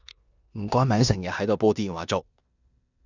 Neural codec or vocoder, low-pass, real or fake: codec, 16 kHz in and 24 kHz out, 0.9 kbps, LongCat-Audio-Codec, four codebook decoder; 7.2 kHz; fake